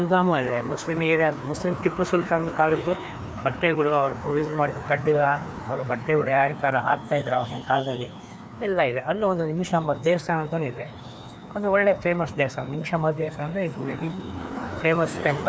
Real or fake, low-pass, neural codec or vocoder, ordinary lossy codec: fake; none; codec, 16 kHz, 2 kbps, FreqCodec, larger model; none